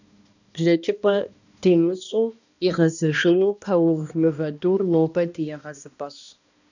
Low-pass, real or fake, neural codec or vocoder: 7.2 kHz; fake; codec, 16 kHz, 1 kbps, X-Codec, HuBERT features, trained on balanced general audio